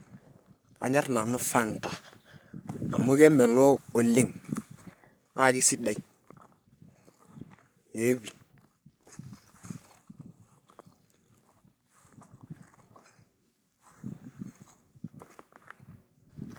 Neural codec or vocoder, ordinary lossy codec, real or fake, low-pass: codec, 44.1 kHz, 3.4 kbps, Pupu-Codec; none; fake; none